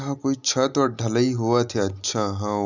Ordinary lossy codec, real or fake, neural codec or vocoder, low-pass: none; real; none; 7.2 kHz